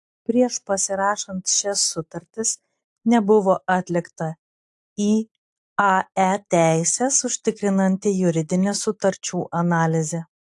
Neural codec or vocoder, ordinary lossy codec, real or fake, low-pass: none; AAC, 64 kbps; real; 10.8 kHz